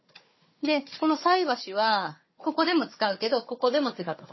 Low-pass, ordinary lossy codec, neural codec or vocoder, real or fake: 7.2 kHz; MP3, 24 kbps; codec, 16 kHz, 4 kbps, FunCodec, trained on Chinese and English, 50 frames a second; fake